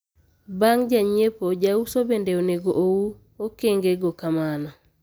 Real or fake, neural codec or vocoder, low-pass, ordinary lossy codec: real; none; none; none